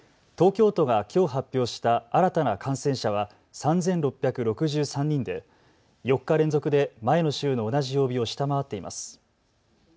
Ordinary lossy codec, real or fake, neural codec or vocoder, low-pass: none; real; none; none